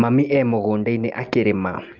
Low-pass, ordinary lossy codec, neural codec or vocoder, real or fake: 7.2 kHz; Opus, 32 kbps; none; real